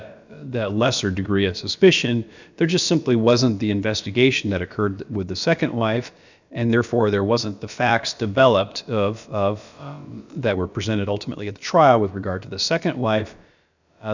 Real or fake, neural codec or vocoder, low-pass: fake; codec, 16 kHz, about 1 kbps, DyCAST, with the encoder's durations; 7.2 kHz